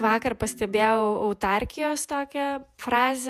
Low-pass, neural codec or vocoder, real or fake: 14.4 kHz; vocoder, 48 kHz, 128 mel bands, Vocos; fake